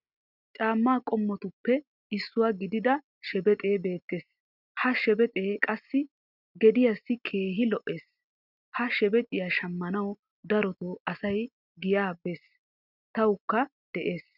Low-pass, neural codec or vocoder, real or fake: 5.4 kHz; none; real